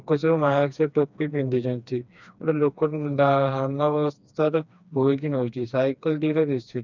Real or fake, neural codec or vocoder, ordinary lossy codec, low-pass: fake; codec, 16 kHz, 2 kbps, FreqCodec, smaller model; none; 7.2 kHz